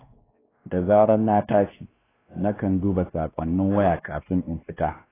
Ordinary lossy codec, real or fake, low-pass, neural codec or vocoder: AAC, 16 kbps; fake; 3.6 kHz; codec, 16 kHz, 2 kbps, X-Codec, WavLM features, trained on Multilingual LibriSpeech